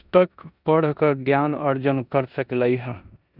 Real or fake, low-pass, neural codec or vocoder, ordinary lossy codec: fake; 5.4 kHz; codec, 16 kHz in and 24 kHz out, 0.9 kbps, LongCat-Audio-Codec, four codebook decoder; none